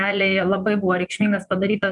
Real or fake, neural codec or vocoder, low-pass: fake; vocoder, 24 kHz, 100 mel bands, Vocos; 10.8 kHz